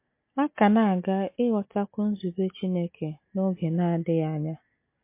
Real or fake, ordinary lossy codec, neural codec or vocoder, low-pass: real; MP3, 24 kbps; none; 3.6 kHz